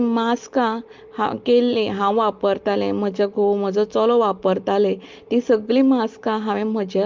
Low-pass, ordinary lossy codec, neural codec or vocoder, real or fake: 7.2 kHz; Opus, 32 kbps; none; real